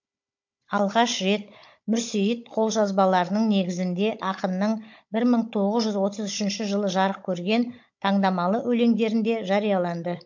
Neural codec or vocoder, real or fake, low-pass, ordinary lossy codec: codec, 16 kHz, 16 kbps, FunCodec, trained on Chinese and English, 50 frames a second; fake; 7.2 kHz; MP3, 48 kbps